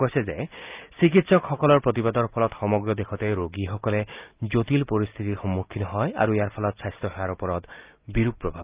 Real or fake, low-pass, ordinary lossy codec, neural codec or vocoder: real; 3.6 kHz; Opus, 24 kbps; none